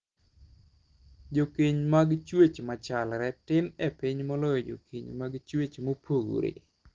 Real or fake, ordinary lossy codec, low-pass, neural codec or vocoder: real; Opus, 16 kbps; 7.2 kHz; none